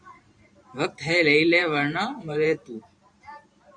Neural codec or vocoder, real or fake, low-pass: vocoder, 44.1 kHz, 128 mel bands every 512 samples, BigVGAN v2; fake; 9.9 kHz